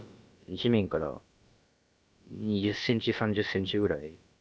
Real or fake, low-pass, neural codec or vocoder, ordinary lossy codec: fake; none; codec, 16 kHz, about 1 kbps, DyCAST, with the encoder's durations; none